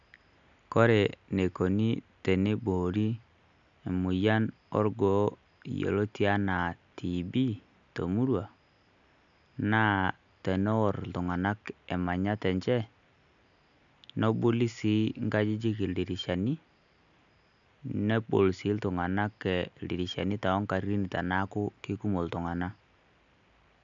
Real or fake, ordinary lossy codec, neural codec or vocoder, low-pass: real; none; none; 7.2 kHz